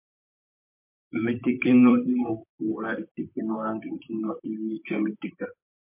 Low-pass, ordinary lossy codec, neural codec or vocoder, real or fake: 3.6 kHz; MP3, 32 kbps; vocoder, 44.1 kHz, 128 mel bands, Pupu-Vocoder; fake